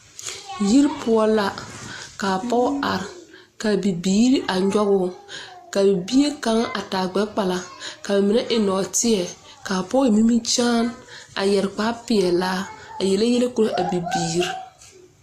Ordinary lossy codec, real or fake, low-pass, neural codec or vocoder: AAC, 48 kbps; real; 14.4 kHz; none